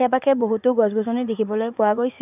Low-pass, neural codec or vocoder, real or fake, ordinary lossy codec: 3.6 kHz; vocoder, 44.1 kHz, 80 mel bands, Vocos; fake; none